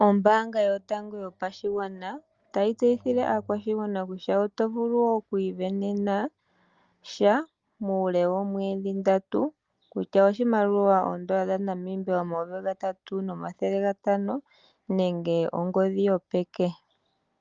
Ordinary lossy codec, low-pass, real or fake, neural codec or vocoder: Opus, 32 kbps; 7.2 kHz; real; none